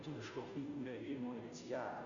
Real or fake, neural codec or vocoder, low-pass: fake; codec, 16 kHz, 0.5 kbps, FunCodec, trained on Chinese and English, 25 frames a second; 7.2 kHz